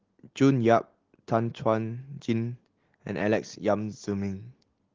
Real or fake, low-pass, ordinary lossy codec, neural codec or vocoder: real; 7.2 kHz; Opus, 16 kbps; none